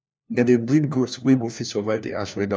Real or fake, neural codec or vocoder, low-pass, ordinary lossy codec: fake; codec, 16 kHz, 1 kbps, FunCodec, trained on LibriTTS, 50 frames a second; none; none